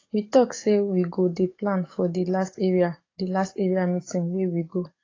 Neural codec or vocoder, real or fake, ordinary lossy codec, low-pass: codec, 44.1 kHz, 7.8 kbps, DAC; fake; AAC, 32 kbps; 7.2 kHz